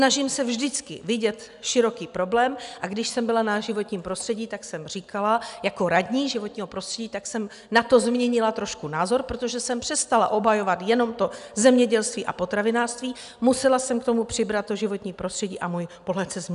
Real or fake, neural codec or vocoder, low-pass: real; none; 10.8 kHz